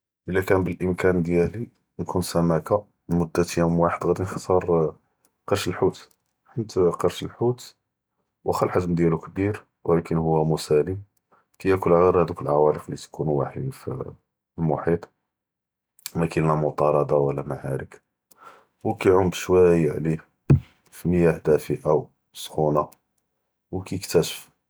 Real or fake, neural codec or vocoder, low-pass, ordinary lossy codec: real; none; none; none